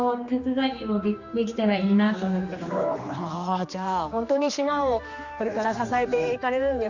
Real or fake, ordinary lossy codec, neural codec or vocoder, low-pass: fake; Opus, 64 kbps; codec, 16 kHz, 2 kbps, X-Codec, HuBERT features, trained on general audio; 7.2 kHz